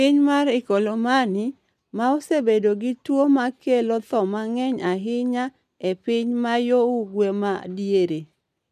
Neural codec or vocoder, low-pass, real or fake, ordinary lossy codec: none; 14.4 kHz; real; none